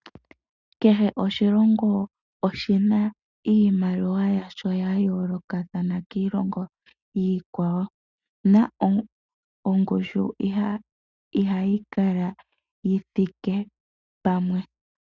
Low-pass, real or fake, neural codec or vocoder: 7.2 kHz; real; none